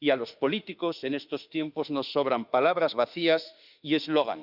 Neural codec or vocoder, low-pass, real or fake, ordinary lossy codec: autoencoder, 48 kHz, 32 numbers a frame, DAC-VAE, trained on Japanese speech; 5.4 kHz; fake; Opus, 64 kbps